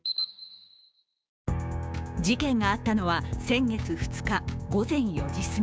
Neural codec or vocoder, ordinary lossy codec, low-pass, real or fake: codec, 16 kHz, 6 kbps, DAC; none; none; fake